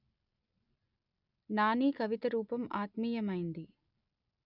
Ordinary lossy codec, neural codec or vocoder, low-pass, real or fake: none; none; 5.4 kHz; real